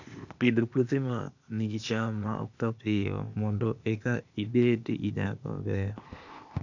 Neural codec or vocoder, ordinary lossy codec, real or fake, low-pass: codec, 16 kHz, 0.8 kbps, ZipCodec; none; fake; 7.2 kHz